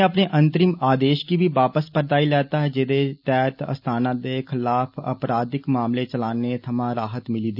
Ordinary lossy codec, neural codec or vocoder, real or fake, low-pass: none; none; real; 5.4 kHz